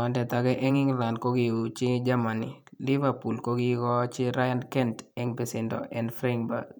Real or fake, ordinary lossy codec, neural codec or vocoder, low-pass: fake; none; vocoder, 44.1 kHz, 128 mel bands every 512 samples, BigVGAN v2; none